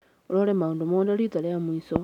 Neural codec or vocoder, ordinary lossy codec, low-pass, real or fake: none; none; 19.8 kHz; real